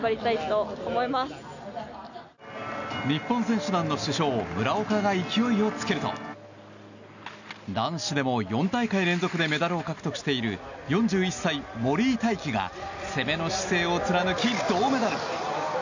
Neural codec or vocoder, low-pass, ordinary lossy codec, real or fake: none; 7.2 kHz; none; real